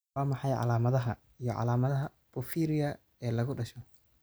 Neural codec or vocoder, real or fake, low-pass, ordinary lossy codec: none; real; none; none